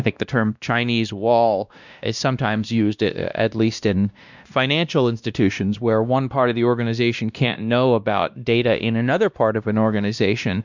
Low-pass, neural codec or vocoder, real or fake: 7.2 kHz; codec, 16 kHz, 1 kbps, X-Codec, WavLM features, trained on Multilingual LibriSpeech; fake